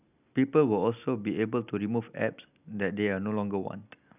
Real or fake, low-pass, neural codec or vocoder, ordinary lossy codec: real; 3.6 kHz; none; none